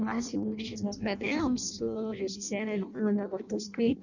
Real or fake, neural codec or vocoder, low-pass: fake; codec, 16 kHz in and 24 kHz out, 0.6 kbps, FireRedTTS-2 codec; 7.2 kHz